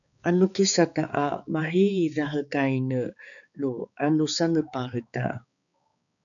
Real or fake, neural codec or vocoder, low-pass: fake; codec, 16 kHz, 4 kbps, X-Codec, HuBERT features, trained on balanced general audio; 7.2 kHz